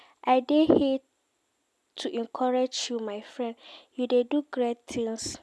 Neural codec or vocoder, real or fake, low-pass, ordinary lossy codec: none; real; none; none